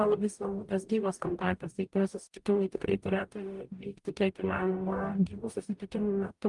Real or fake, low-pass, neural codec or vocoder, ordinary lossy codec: fake; 10.8 kHz; codec, 44.1 kHz, 0.9 kbps, DAC; Opus, 32 kbps